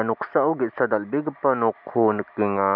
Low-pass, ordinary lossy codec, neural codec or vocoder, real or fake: 5.4 kHz; none; none; real